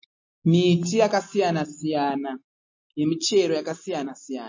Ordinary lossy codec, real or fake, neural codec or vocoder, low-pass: MP3, 32 kbps; real; none; 7.2 kHz